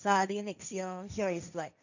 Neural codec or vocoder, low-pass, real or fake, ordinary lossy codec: codec, 16 kHz, 1.1 kbps, Voila-Tokenizer; 7.2 kHz; fake; none